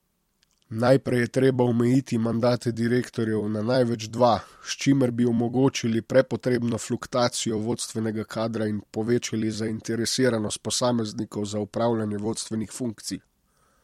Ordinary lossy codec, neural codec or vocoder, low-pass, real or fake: MP3, 64 kbps; vocoder, 44.1 kHz, 128 mel bands every 256 samples, BigVGAN v2; 19.8 kHz; fake